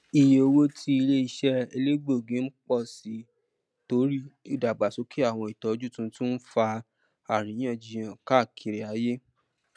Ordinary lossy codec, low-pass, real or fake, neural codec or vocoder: none; none; real; none